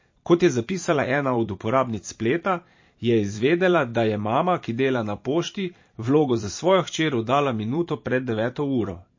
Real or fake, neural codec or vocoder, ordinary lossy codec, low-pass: fake; vocoder, 44.1 kHz, 128 mel bands every 512 samples, BigVGAN v2; MP3, 32 kbps; 7.2 kHz